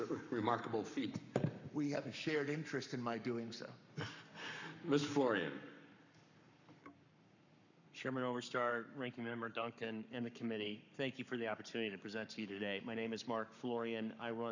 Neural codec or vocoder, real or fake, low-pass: codec, 44.1 kHz, 7.8 kbps, Pupu-Codec; fake; 7.2 kHz